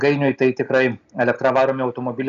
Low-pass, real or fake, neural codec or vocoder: 7.2 kHz; real; none